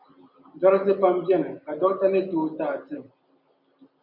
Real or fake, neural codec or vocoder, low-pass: real; none; 5.4 kHz